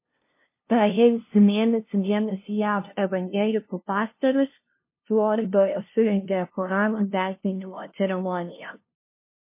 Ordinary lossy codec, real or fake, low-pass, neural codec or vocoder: MP3, 24 kbps; fake; 3.6 kHz; codec, 16 kHz, 0.5 kbps, FunCodec, trained on LibriTTS, 25 frames a second